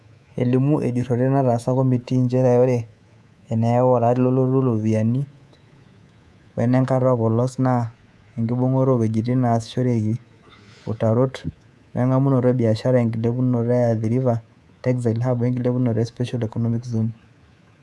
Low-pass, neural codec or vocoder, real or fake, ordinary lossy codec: none; codec, 24 kHz, 3.1 kbps, DualCodec; fake; none